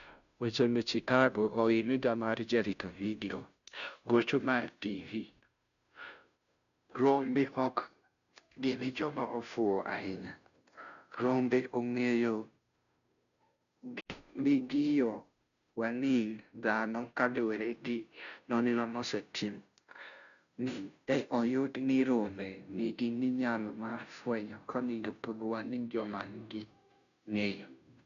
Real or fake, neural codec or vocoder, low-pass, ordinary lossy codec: fake; codec, 16 kHz, 0.5 kbps, FunCodec, trained on Chinese and English, 25 frames a second; 7.2 kHz; none